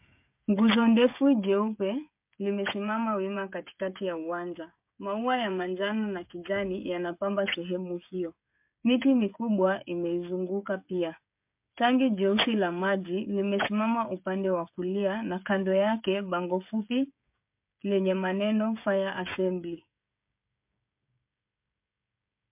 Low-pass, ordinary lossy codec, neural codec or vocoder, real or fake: 3.6 kHz; MP3, 32 kbps; codec, 16 kHz, 16 kbps, FreqCodec, smaller model; fake